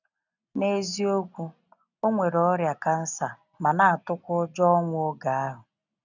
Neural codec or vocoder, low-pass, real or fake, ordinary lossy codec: none; 7.2 kHz; real; none